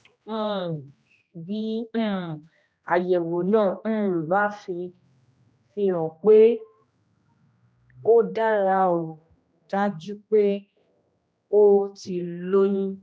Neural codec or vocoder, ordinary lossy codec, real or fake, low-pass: codec, 16 kHz, 1 kbps, X-Codec, HuBERT features, trained on general audio; none; fake; none